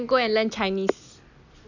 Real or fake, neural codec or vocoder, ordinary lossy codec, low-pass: real; none; none; 7.2 kHz